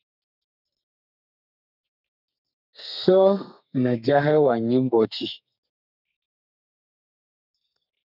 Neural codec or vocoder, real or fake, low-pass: codec, 32 kHz, 1.9 kbps, SNAC; fake; 5.4 kHz